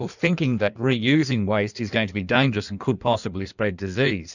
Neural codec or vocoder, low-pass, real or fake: codec, 16 kHz in and 24 kHz out, 1.1 kbps, FireRedTTS-2 codec; 7.2 kHz; fake